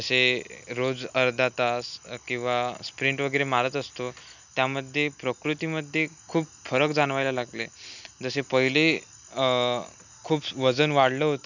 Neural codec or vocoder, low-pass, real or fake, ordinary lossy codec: none; 7.2 kHz; real; none